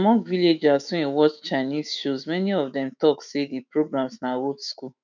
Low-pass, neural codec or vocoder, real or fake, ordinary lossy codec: 7.2 kHz; autoencoder, 48 kHz, 128 numbers a frame, DAC-VAE, trained on Japanese speech; fake; none